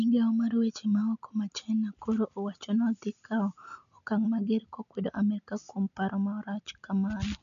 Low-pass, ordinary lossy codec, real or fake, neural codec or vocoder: 7.2 kHz; none; real; none